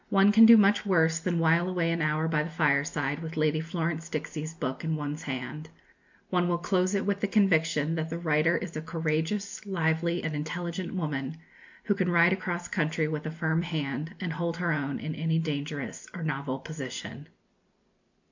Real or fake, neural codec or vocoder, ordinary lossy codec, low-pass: real; none; MP3, 64 kbps; 7.2 kHz